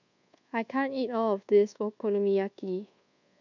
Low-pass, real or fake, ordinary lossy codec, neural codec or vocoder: 7.2 kHz; fake; none; codec, 24 kHz, 1.2 kbps, DualCodec